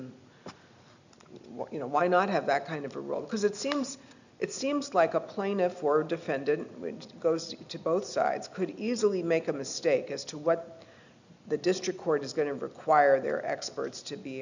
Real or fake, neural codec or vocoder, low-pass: real; none; 7.2 kHz